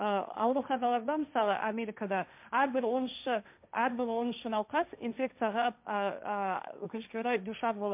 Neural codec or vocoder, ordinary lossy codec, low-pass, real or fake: codec, 16 kHz, 1.1 kbps, Voila-Tokenizer; MP3, 32 kbps; 3.6 kHz; fake